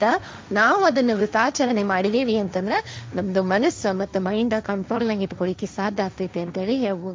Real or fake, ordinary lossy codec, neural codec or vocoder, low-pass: fake; none; codec, 16 kHz, 1.1 kbps, Voila-Tokenizer; none